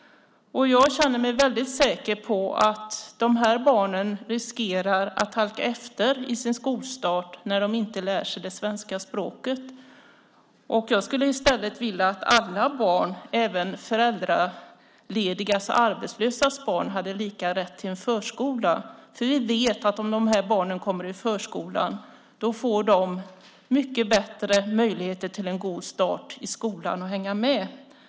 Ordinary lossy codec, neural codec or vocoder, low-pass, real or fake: none; none; none; real